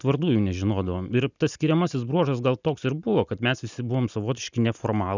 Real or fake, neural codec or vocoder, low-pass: real; none; 7.2 kHz